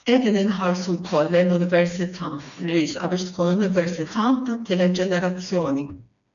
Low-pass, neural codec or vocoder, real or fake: 7.2 kHz; codec, 16 kHz, 2 kbps, FreqCodec, smaller model; fake